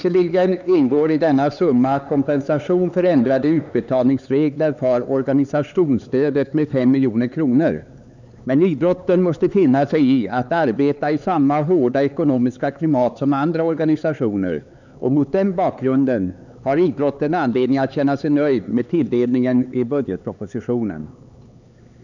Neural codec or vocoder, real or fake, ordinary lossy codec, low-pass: codec, 16 kHz, 4 kbps, X-Codec, HuBERT features, trained on LibriSpeech; fake; none; 7.2 kHz